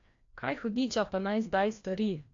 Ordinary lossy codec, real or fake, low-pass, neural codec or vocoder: AAC, 48 kbps; fake; 7.2 kHz; codec, 16 kHz, 1 kbps, FreqCodec, larger model